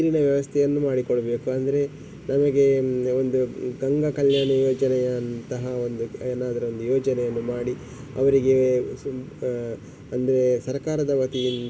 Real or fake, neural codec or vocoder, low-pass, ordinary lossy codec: real; none; none; none